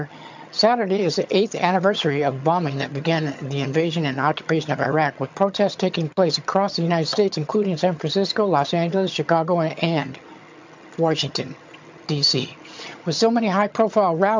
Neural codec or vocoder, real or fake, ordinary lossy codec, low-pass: vocoder, 22.05 kHz, 80 mel bands, HiFi-GAN; fake; MP3, 64 kbps; 7.2 kHz